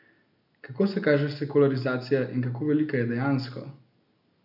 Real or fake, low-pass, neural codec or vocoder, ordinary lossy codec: real; 5.4 kHz; none; none